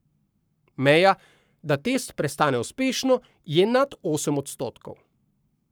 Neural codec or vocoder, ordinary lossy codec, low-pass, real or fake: codec, 44.1 kHz, 7.8 kbps, Pupu-Codec; none; none; fake